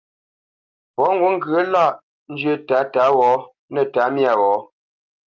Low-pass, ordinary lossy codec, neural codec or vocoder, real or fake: 7.2 kHz; Opus, 24 kbps; none; real